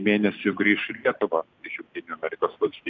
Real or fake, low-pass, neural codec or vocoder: real; 7.2 kHz; none